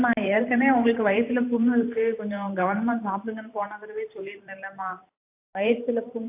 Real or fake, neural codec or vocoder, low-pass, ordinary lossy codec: fake; vocoder, 44.1 kHz, 128 mel bands every 512 samples, BigVGAN v2; 3.6 kHz; AAC, 32 kbps